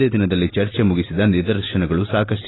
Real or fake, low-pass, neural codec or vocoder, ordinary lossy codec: real; 7.2 kHz; none; AAC, 16 kbps